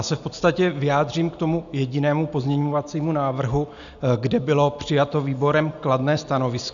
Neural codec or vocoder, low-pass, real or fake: none; 7.2 kHz; real